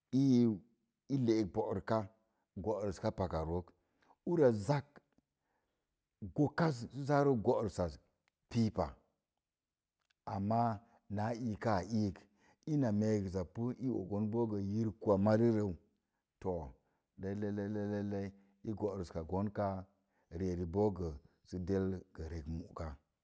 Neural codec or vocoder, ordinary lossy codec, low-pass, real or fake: none; none; none; real